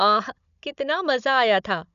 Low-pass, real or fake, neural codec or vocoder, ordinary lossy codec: 7.2 kHz; fake; codec, 16 kHz, 16 kbps, FunCodec, trained on Chinese and English, 50 frames a second; none